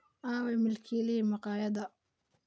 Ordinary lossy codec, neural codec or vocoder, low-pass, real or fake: none; none; none; real